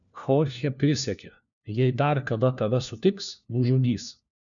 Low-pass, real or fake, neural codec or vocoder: 7.2 kHz; fake; codec, 16 kHz, 1 kbps, FunCodec, trained on LibriTTS, 50 frames a second